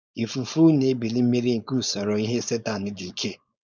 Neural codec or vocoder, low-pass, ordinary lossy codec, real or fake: codec, 16 kHz, 4.8 kbps, FACodec; none; none; fake